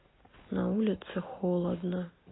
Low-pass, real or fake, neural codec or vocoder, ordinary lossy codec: 7.2 kHz; real; none; AAC, 16 kbps